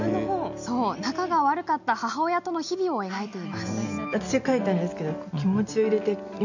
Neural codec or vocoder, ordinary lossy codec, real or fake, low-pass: none; none; real; 7.2 kHz